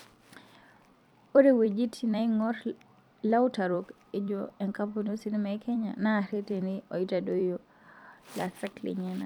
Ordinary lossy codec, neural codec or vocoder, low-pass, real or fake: none; vocoder, 44.1 kHz, 128 mel bands every 256 samples, BigVGAN v2; 19.8 kHz; fake